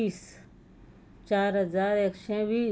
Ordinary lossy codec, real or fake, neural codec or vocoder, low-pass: none; real; none; none